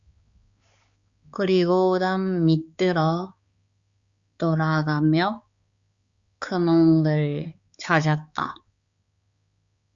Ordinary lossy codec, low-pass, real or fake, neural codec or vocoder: Opus, 64 kbps; 7.2 kHz; fake; codec, 16 kHz, 4 kbps, X-Codec, HuBERT features, trained on balanced general audio